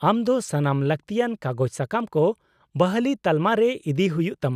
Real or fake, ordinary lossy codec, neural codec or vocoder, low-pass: real; none; none; 14.4 kHz